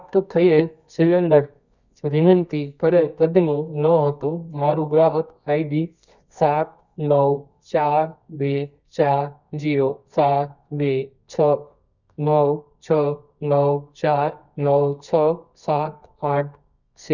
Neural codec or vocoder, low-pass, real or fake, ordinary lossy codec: codec, 24 kHz, 0.9 kbps, WavTokenizer, medium music audio release; 7.2 kHz; fake; none